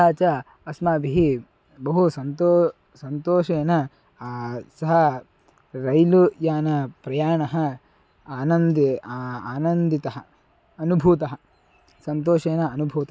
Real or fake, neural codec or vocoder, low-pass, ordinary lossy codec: real; none; none; none